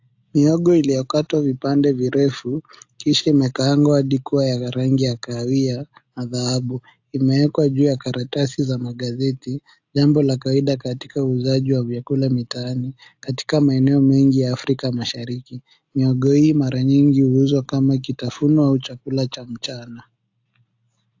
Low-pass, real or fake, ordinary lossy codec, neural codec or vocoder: 7.2 kHz; real; AAC, 48 kbps; none